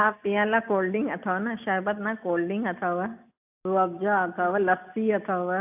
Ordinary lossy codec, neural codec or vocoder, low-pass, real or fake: AAC, 32 kbps; none; 3.6 kHz; real